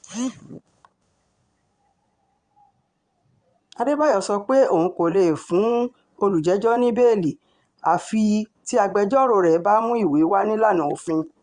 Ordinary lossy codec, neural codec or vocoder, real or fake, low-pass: Opus, 64 kbps; vocoder, 22.05 kHz, 80 mel bands, Vocos; fake; 9.9 kHz